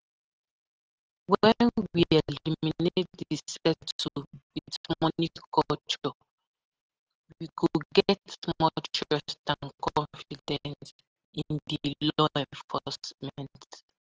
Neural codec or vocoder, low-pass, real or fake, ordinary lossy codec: none; 7.2 kHz; real; Opus, 16 kbps